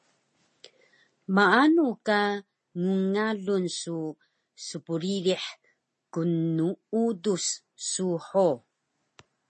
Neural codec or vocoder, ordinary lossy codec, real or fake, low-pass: none; MP3, 32 kbps; real; 10.8 kHz